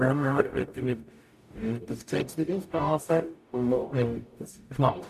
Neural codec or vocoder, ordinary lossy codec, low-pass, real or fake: codec, 44.1 kHz, 0.9 kbps, DAC; MP3, 96 kbps; 14.4 kHz; fake